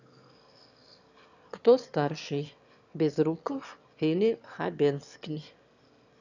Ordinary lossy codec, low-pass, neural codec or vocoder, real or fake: none; 7.2 kHz; autoencoder, 22.05 kHz, a latent of 192 numbers a frame, VITS, trained on one speaker; fake